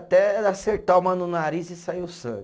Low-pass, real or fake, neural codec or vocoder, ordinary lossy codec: none; real; none; none